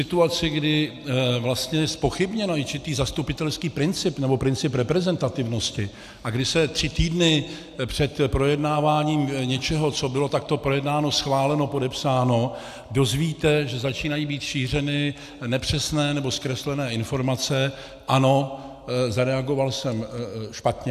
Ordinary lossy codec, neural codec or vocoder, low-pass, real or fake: AAC, 96 kbps; none; 14.4 kHz; real